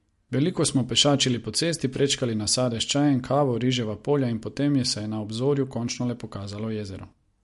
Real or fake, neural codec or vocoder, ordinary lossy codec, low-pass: real; none; MP3, 48 kbps; 14.4 kHz